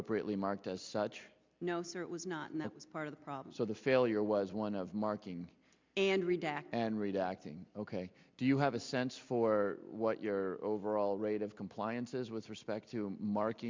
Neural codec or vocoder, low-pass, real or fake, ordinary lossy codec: none; 7.2 kHz; real; MP3, 64 kbps